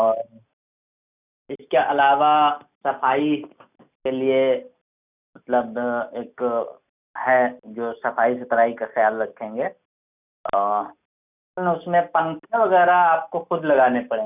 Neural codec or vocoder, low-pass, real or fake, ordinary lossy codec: none; 3.6 kHz; real; none